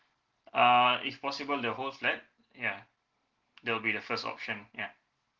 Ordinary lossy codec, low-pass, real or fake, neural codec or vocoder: Opus, 16 kbps; 7.2 kHz; real; none